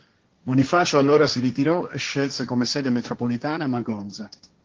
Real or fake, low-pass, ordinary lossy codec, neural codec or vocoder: fake; 7.2 kHz; Opus, 16 kbps; codec, 16 kHz, 1.1 kbps, Voila-Tokenizer